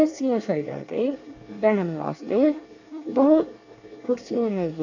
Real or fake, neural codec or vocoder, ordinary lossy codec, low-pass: fake; codec, 24 kHz, 1 kbps, SNAC; AAC, 48 kbps; 7.2 kHz